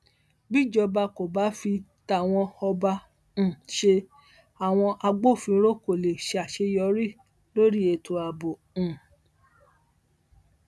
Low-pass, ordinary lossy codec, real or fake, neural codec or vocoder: none; none; real; none